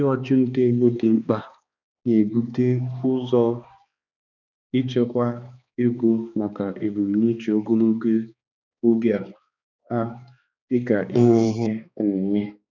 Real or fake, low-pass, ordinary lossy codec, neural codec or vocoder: fake; 7.2 kHz; none; codec, 16 kHz, 2 kbps, X-Codec, HuBERT features, trained on balanced general audio